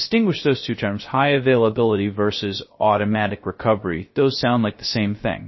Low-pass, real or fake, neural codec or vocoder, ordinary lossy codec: 7.2 kHz; fake; codec, 16 kHz, 0.3 kbps, FocalCodec; MP3, 24 kbps